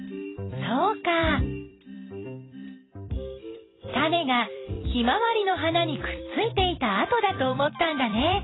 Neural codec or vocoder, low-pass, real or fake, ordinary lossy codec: vocoder, 44.1 kHz, 128 mel bands every 256 samples, BigVGAN v2; 7.2 kHz; fake; AAC, 16 kbps